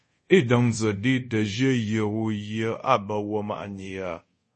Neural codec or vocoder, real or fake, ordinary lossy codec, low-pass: codec, 24 kHz, 0.5 kbps, DualCodec; fake; MP3, 32 kbps; 10.8 kHz